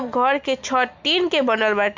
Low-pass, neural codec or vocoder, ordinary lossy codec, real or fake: 7.2 kHz; none; MP3, 64 kbps; real